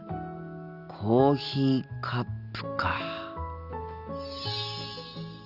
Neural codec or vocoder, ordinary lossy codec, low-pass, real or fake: none; Opus, 64 kbps; 5.4 kHz; real